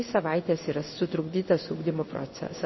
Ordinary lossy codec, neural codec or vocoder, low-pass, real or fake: MP3, 24 kbps; codec, 16 kHz in and 24 kHz out, 1 kbps, XY-Tokenizer; 7.2 kHz; fake